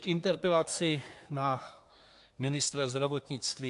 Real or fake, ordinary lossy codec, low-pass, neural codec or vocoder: fake; AAC, 64 kbps; 10.8 kHz; codec, 24 kHz, 1 kbps, SNAC